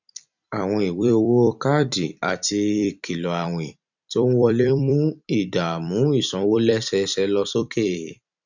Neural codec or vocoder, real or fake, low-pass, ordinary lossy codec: vocoder, 44.1 kHz, 128 mel bands every 256 samples, BigVGAN v2; fake; 7.2 kHz; none